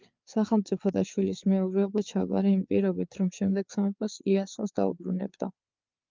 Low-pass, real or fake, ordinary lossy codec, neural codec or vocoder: 7.2 kHz; fake; Opus, 24 kbps; codec, 16 kHz, 4 kbps, FreqCodec, larger model